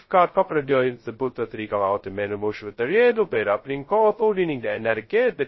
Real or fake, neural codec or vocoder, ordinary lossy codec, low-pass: fake; codec, 16 kHz, 0.2 kbps, FocalCodec; MP3, 24 kbps; 7.2 kHz